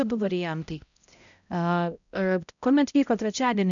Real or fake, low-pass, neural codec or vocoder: fake; 7.2 kHz; codec, 16 kHz, 0.5 kbps, X-Codec, HuBERT features, trained on balanced general audio